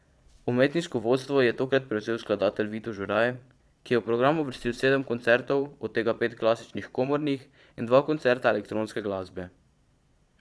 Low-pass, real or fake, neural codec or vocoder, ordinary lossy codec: none; fake; vocoder, 22.05 kHz, 80 mel bands, Vocos; none